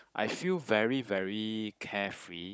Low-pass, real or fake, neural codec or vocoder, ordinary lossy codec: none; fake; codec, 16 kHz, 16 kbps, FunCodec, trained on Chinese and English, 50 frames a second; none